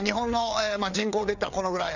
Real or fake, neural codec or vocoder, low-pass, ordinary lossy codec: fake; codec, 16 kHz, 4 kbps, FreqCodec, larger model; 7.2 kHz; none